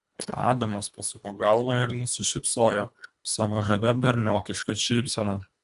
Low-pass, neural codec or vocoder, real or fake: 10.8 kHz; codec, 24 kHz, 1.5 kbps, HILCodec; fake